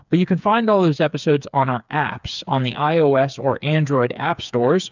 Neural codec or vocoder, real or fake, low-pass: codec, 16 kHz, 4 kbps, FreqCodec, smaller model; fake; 7.2 kHz